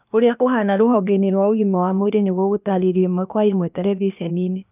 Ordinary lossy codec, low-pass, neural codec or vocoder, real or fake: none; 3.6 kHz; codec, 16 kHz, 0.8 kbps, ZipCodec; fake